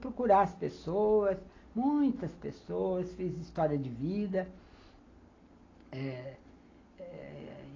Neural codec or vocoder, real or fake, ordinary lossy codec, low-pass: none; real; AAC, 32 kbps; 7.2 kHz